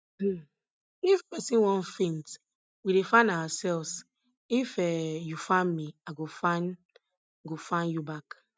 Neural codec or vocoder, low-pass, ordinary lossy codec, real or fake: none; none; none; real